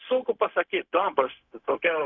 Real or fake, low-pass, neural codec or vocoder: fake; 7.2 kHz; codec, 16 kHz, 0.4 kbps, LongCat-Audio-Codec